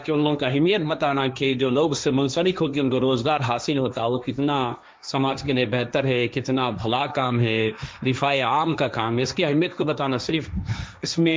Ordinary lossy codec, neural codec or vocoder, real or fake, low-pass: none; codec, 16 kHz, 1.1 kbps, Voila-Tokenizer; fake; none